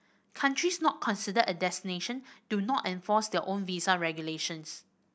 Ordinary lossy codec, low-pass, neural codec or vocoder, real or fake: none; none; none; real